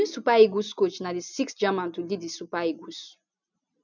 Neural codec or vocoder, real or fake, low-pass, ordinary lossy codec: none; real; 7.2 kHz; none